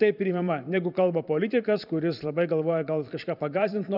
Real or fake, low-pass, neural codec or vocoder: fake; 5.4 kHz; vocoder, 44.1 kHz, 128 mel bands every 512 samples, BigVGAN v2